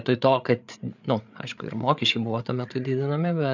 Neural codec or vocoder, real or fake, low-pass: codec, 16 kHz, 8 kbps, FreqCodec, larger model; fake; 7.2 kHz